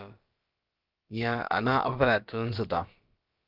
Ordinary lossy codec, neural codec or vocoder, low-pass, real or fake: Opus, 24 kbps; codec, 16 kHz, about 1 kbps, DyCAST, with the encoder's durations; 5.4 kHz; fake